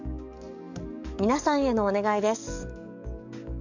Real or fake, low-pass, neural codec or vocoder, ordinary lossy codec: fake; 7.2 kHz; codec, 44.1 kHz, 7.8 kbps, DAC; none